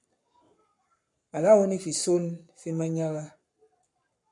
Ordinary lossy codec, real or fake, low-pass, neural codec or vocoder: MP3, 64 kbps; fake; 10.8 kHz; codec, 44.1 kHz, 7.8 kbps, Pupu-Codec